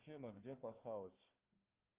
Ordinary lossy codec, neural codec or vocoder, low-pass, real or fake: Opus, 24 kbps; codec, 16 kHz, 1 kbps, FunCodec, trained on Chinese and English, 50 frames a second; 3.6 kHz; fake